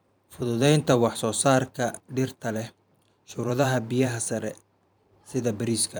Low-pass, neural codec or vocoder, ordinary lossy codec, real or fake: none; vocoder, 44.1 kHz, 128 mel bands every 512 samples, BigVGAN v2; none; fake